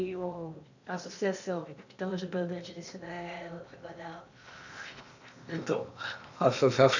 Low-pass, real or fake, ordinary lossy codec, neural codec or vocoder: 7.2 kHz; fake; none; codec, 16 kHz in and 24 kHz out, 0.8 kbps, FocalCodec, streaming, 65536 codes